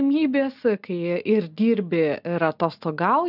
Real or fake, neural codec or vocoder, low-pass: real; none; 5.4 kHz